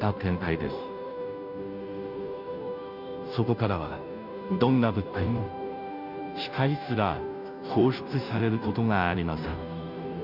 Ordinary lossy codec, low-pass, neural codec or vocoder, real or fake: none; 5.4 kHz; codec, 16 kHz, 0.5 kbps, FunCodec, trained on Chinese and English, 25 frames a second; fake